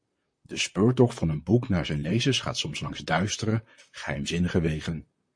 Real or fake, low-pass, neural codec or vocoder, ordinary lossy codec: fake; 9.9 kHz; vocoder, 44.1 kHz, 128 mel bands, Pupu-Vocoder; MP3, 48 kbps